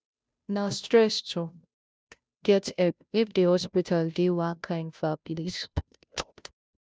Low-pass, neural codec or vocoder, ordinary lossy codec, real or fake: none; codec, 16 kHz, 0.5 kbps, FunCodec, trained on Chinese and English, 25 frames a second; none; fake